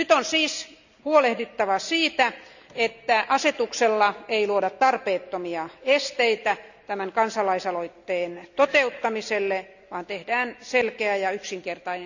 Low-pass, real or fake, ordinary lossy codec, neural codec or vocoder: 7.2 kHz; real; none; none